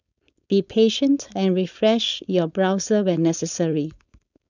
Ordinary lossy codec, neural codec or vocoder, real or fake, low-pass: none; codec, 16 kHz, 4.8 kbps, FACodec; fake; 7.2 kHz